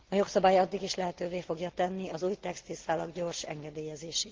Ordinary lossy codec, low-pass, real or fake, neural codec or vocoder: Opus, 16 kbps; 7.2 kHz; real; none